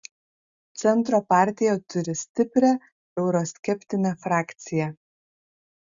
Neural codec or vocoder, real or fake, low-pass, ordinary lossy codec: none; real; 7.2 kHz; Opus, 64 kbps